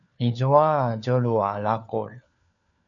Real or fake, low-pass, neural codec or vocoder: fake; 7.2 kHz; codec, 16 kHz, 4 kbps, FunCodec, trained on LibriTTS, 50 frames a second